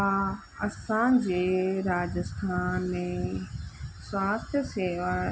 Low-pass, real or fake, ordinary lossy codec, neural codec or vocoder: none; real; none; none